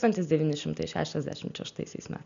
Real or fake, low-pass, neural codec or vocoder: real; 7.2 kHz; none